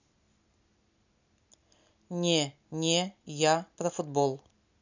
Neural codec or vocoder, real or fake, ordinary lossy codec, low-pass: none; real; none; 7.2 kHz